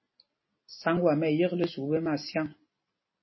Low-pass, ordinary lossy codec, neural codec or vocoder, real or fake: 7.2 kHz; MP3, 24 kbps; none; real